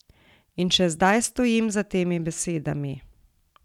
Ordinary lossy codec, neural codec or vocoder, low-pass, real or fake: none; vocoder, 44.1 kHz, 128 mel bands every 256 samples, BigVGAN v2; 19.8 kHz; fake